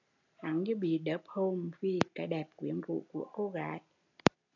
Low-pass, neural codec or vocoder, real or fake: 7.2 kHz; none; real